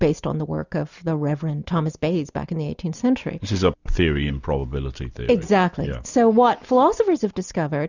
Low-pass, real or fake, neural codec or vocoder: 7.2 kHz; real; none